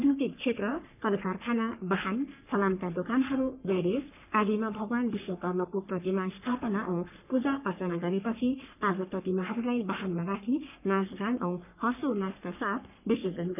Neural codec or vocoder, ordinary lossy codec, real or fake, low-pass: codec, 44.1 kHz, 3.4 kbps, Pupu-Codec; none; fake; 3.6 kHz